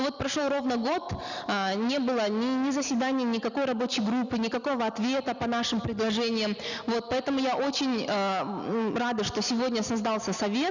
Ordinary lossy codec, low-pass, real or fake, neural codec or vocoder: none; 7.2 kHz; real; none